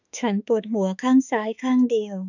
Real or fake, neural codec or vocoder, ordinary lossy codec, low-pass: fake; autoencoder, 48 kHz, 32 numbers a frame, DAC-VAE, trained on Japanese speech; none; 7.2 kHz